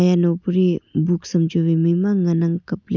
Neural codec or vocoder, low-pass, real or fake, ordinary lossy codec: none; 7.2 kHz; real; none